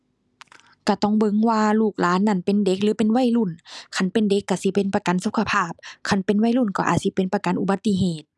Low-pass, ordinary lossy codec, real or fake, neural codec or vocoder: none; none; real; none